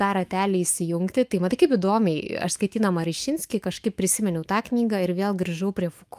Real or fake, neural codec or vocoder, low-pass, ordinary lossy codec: fake; autoencoder, 48 kHz, 128 numbers a frame, DAC-VAE, trained on Japanese speech; 14.4 kHz; Opus, 32 kbps